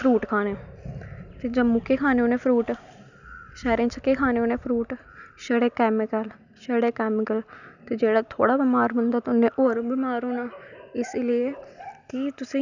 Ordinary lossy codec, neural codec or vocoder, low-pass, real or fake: none; none; 7.2 kHz; real